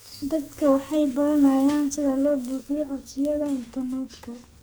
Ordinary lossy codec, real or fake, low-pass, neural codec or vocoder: none; fake; none; codec, 44.1 kHz, 2.6 kbps, SNAC